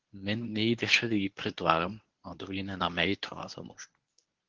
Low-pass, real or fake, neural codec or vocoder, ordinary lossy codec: 7.2 kHz; fake; codec, 24 kHz, 0.9 kbps, WavTokenizer, medium speech release version 1; Opus, 16 kbps